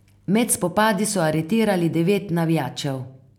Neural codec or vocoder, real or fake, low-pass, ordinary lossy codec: none; real; 19.8 kHz; none